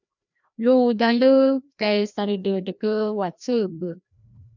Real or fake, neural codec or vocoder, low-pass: fake; codec, 16 kHz, 1 kbps, FreqCodec, larger model; 7.2 kHz